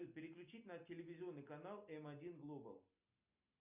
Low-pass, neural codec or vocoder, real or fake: 3.6 kHz; none; real